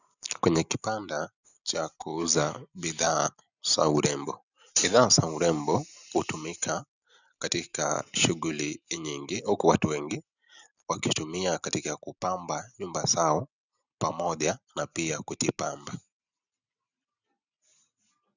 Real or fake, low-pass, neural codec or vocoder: real; 7.2 kHz; none